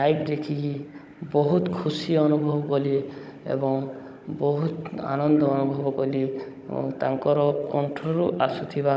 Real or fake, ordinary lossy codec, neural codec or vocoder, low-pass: fake; none; codec, 16 kHz, 16 kbps, FunCodec, trained on Chinese and English, 50 frames a second; none